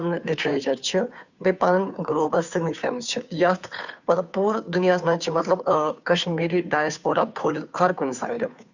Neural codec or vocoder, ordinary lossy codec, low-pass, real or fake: codec, 16 kHz, 2 kbps, FunCodec, trained on Chinese and English, 25 frames a second; none; 7.2 kHz; fake